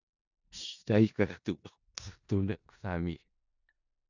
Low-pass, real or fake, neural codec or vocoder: 7.2 kHz; fake; codec, 16 kHz in and 24 kHz out, 0.4 kbps, LongCat-Audio-Codec, four codebook decoder